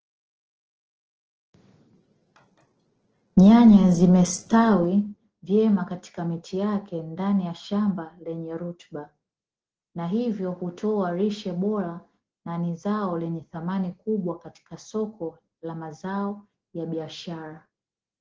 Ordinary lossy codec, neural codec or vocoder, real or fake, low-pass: Opus, 16 kbps; none; real; 7.2 kHz